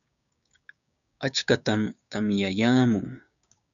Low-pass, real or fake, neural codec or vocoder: 7.2 kHz; fake; codec, 16 kHz, 6 kbps, DAC